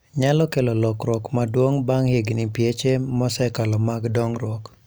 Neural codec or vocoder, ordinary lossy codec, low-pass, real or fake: none; none; none; real